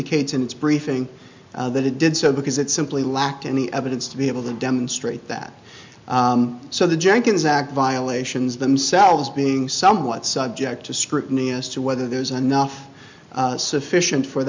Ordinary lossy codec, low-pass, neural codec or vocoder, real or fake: MP3, 64 kbps; 7.2 kHz; none; real